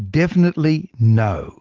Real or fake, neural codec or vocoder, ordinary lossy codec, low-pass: real; none; Opus, 16 kbps; 7.2 kHz